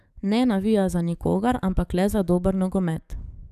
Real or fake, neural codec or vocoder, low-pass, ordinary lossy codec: fake; codec, 44.1 kHz, 7.8 kbps, DAC; 14.4 kHz; none